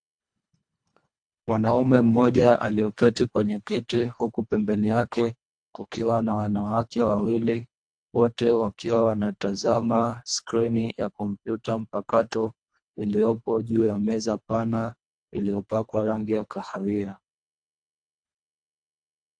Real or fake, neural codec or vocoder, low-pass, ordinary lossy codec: fake; codec, 24 kHz, 1.5 kbps, HILCodec; 9.9 kHz; AAC, 64 kbps